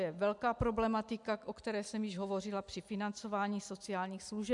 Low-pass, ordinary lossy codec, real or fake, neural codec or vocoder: 10.8 kHz; MP3, 96 kbps; real; none